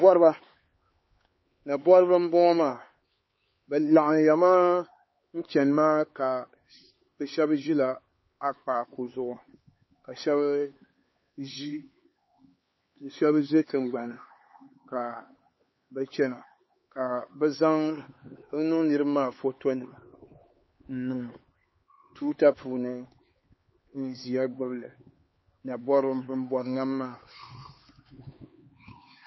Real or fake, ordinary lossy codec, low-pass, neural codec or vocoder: fake; MP3, 24 kbps; 7.2 kHz; codec, 16 kHz, 4 kbps, X-Codec, HuBERT features, trained on LibriSpeech